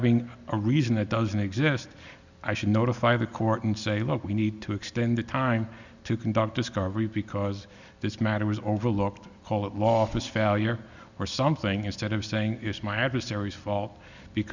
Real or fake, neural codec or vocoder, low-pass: real; none; 7.2 kHz